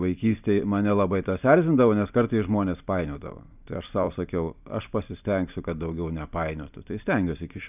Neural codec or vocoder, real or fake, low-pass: vocoder, 44.1 kHz, 128 mel bands every 256 samples, BigVGAN v2; fake; 3.6 kHz